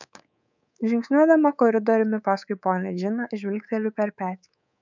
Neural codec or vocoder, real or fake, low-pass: codec, 24 kHz, 3.1 kbps, DualCodec; fake; 7.2 kHz